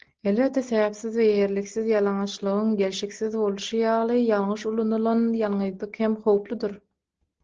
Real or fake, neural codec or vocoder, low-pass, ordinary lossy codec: real; none; 7.2 kHz; Opus, 16 kbps